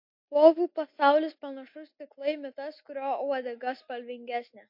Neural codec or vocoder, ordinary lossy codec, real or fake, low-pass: none; MP3, 32 kbps; real; 5.4 kHz